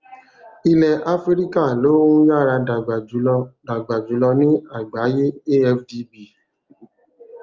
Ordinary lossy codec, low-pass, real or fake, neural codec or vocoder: Opus, 32 kbps; 7.2 kHz; real; none